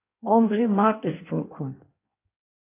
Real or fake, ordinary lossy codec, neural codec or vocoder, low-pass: fake; AAC, 24 kbps; codec, 16 kHz in and 24 kHz out, 1.1 kbps, FireRedTTS-2 codec; 3.6 kHz